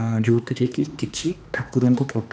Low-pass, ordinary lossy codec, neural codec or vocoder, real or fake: none; none; codec, 16 kHz, 2 kbps, X-Codec, HuBERT features, trained on balanced general audio; fake